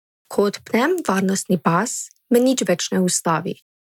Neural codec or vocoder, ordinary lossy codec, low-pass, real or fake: none; none; 19.8 kHz; real